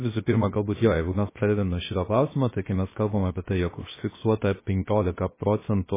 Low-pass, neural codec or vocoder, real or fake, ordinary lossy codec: 3.6 kHz; codec, 16 kHz, 0.7 kbps, FocalCodec; fake; MP3, 16 kbps